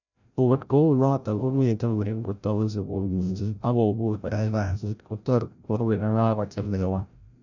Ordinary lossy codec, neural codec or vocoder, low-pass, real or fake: none; codec, 16 kHz, 0.5 kbps, FreqCodec, larger model; 7.2 kHz; fake